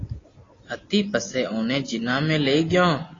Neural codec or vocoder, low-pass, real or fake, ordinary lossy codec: none; 7.2 kHz; real; AAC, 32 kbps